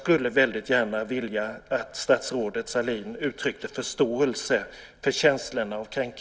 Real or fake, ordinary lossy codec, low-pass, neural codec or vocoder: real; none; none; none